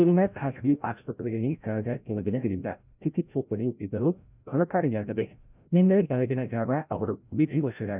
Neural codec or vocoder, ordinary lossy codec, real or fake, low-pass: codec, 16 kHz, 0.5 kbps, FreqCodec, larger model; none; fake; 3.6 kHz